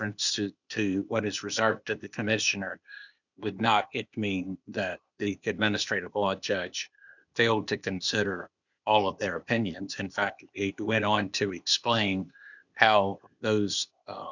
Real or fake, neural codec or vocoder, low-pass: fake; codec, 16 kHz, 0.8 kbps, ZipCodec; 7.2 kHz